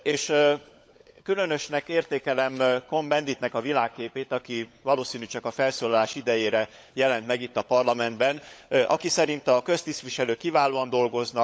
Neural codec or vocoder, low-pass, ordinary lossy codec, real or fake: codec, 16 kHz, 16 kbps, FunCodec, trained on LibriTTS, 50 frames a second; none; none; fake